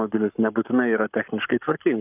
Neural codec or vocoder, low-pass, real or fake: codec, 44.1 kHz, 7.8 kbps, Pupu-Codec; 3.6 kHz; fake